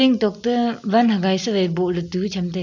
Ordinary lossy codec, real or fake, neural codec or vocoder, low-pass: none; real; none; 7.2 kHz